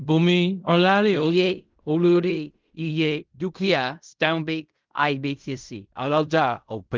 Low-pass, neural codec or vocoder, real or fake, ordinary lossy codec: 7.2 kHz; codec, 16 kHz in and 24 kHz out, 0.4 kbps, LongCat-Audio-Codec, fine tuned four codebook decoder; fake; Opus, 24 kbps